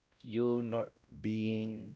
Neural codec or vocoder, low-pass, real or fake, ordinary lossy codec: codec, 16 kHz, 0.5 kbps, X-Codec, WavLM features, trained on Multilingual LibriSpeech; none; fake; none